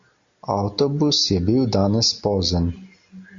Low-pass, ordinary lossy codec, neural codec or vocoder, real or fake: 7.2 kHz; AAC, 48 kbps; none; real